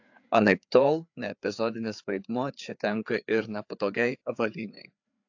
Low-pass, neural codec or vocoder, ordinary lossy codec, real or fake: 7.2 kHz; codec, 16 kHz, 4 kbps, FreqCodec, larger model; AAC, 48 kbps; fake